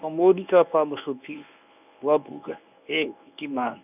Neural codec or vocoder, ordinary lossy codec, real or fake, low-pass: codec, 24 kHz, 0.9 kbps, WavTokenizer, medium speech release version 1; none; fake; 3.6 kHz